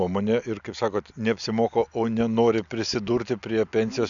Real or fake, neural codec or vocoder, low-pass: real; none; 7.2 kHz